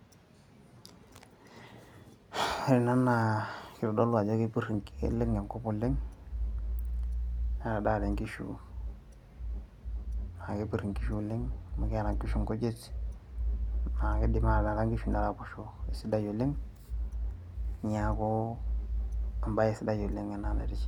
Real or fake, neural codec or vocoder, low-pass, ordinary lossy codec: real; none; 19.8 kHz; none